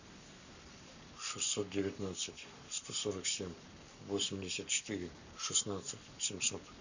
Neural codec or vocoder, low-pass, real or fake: codec, 44.1 kHz, 7.8 kbps, Pupu-Codec; 7.2 kHz; fake